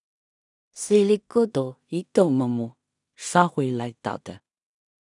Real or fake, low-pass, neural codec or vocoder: fake; 10.8 kHz; codec, 16 kHz in and 24 kHz out, 0.4 kbps, LongCat-Audio-Codec, two codebook decoder